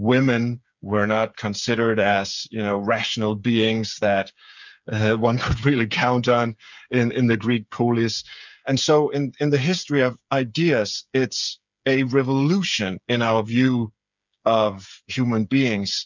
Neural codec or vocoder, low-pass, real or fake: codec, 16 kHz, 8 kbps, FreqCodec, smaller model; 7.2 kHz; fake